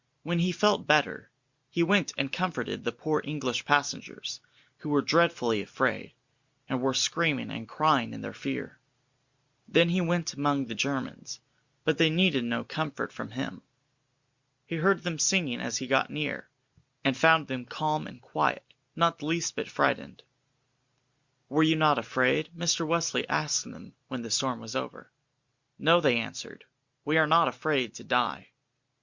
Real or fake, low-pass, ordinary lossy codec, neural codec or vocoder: real; 7.2 kHz; Opus, 64 kbps; none